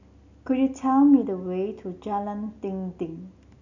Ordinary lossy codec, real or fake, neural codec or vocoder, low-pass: none; real; none; 7.2 kHz